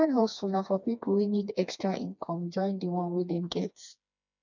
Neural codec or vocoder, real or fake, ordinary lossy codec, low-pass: codec, 16 kHz, 2 kbps, FreqCodec, smaller model; fake; none; 7.2 kHz